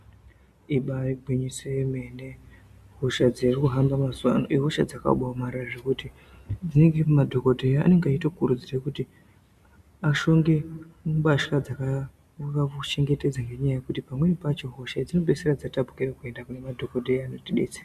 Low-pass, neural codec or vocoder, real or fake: 14.4 kHz; none; real